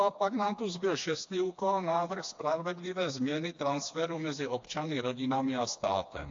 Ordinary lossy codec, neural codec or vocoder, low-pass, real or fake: AAC, 48 kbps; codec, 16 kHz, 2 kbps, FreqCodec, smaller model; 7.2 kHz; fake